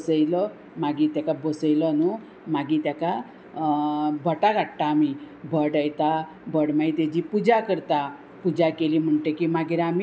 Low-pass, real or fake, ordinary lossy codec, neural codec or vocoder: none; real; none; none